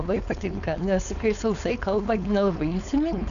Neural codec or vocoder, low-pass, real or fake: codec, 16 kHz, 4.8 kbps, FACodec; 7.2 kHz; fake